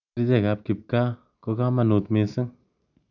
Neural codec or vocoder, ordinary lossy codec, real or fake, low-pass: none; none; real; 7.2 kHz